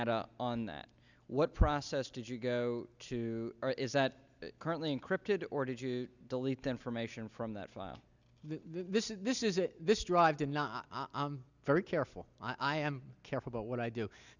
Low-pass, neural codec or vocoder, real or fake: 7.2 kHz; none; real